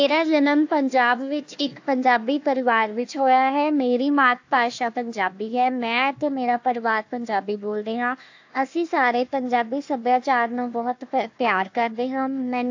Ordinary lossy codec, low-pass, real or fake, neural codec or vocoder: AAC, 48 kbps; 7.2 kHz; fake; codec, 16 kHz, 1 kbps, FunCodec, trained on Chinese and English, 50 frames a second